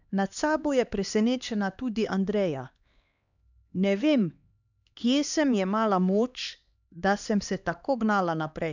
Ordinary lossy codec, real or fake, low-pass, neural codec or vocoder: none; fake; 7.2 kHz; codec, 16 kHz, 2 kbps, X-Codec, HuBERT features, trained on LibriSpeech